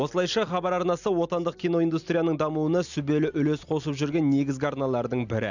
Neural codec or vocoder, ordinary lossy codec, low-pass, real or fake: none; none; 7.2 kHz; real